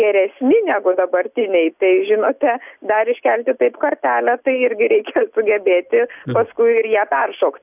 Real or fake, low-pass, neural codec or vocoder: real; 3.6 kHz; none